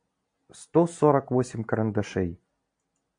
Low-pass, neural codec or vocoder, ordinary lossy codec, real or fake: 9.9 kHz; none; MP3, 64 kbps; real